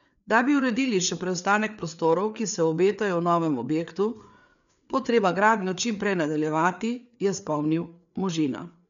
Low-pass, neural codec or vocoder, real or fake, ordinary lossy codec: 7.2 kHz; codec, 16 kHz, 4 kbps, FreqCodec, larger model; fake; none